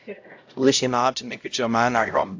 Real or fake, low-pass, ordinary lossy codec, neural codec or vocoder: fake; 7.2 kHz; AAC, 48 kbps; codec, 16 kHz, 0.5 kbps, X-Codec, HuBERT features, trained on LibriSpeech